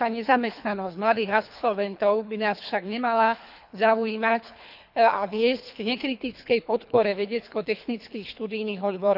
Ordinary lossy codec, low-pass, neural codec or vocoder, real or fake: none; 5.4 kHz; codec, 24 kHz, 3 kbps, HILCodec; fake